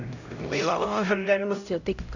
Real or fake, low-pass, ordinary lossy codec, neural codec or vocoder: fake; 7.2 kHz; none; codec, 16 kHz, 0.5 kbps, X-Codec, HuBERT features, trained on LibriSpeech